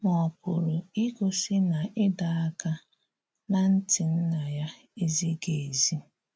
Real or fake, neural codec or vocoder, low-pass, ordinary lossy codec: real; none; none; none